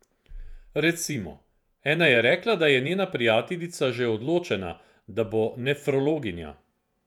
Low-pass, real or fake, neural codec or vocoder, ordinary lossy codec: 19.8 kHz; fake; vocoder, 48 kHz, 128 mel bands, Vocos; none